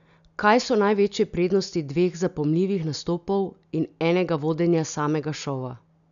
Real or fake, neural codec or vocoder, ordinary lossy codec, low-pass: real; none; none; 7.2 kHz